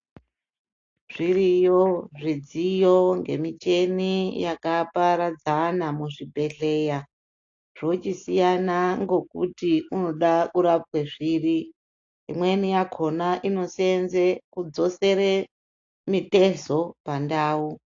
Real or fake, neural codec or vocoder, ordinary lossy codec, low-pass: real; none; AAC, 48 kbps; 7.2 kHz